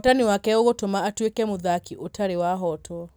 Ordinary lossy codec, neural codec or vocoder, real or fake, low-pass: none; none; real; none